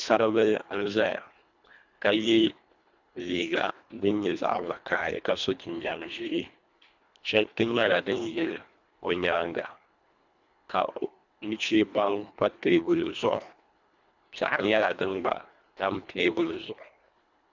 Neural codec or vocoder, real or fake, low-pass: codec, 24 kHz, 1.5 kbps, HILCodec; fake; 7.2 kHz